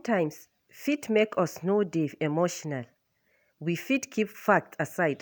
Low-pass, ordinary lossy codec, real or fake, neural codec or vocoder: none; none; real; none